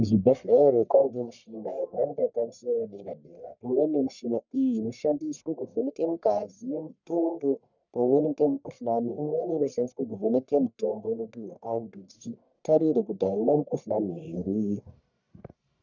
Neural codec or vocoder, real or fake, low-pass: codec, 44.1 kHz, 1.7 kbps, Pupu-Codec; fake; 7.2 kHz